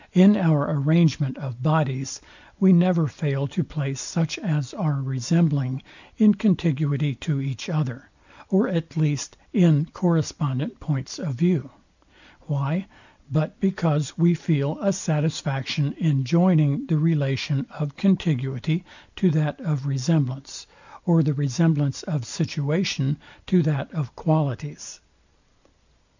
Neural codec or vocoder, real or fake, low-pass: none; real; 7.2 kHz